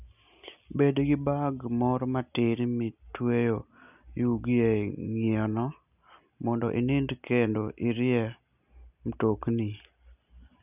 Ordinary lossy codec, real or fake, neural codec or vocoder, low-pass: none; real; none; 3.6 kHz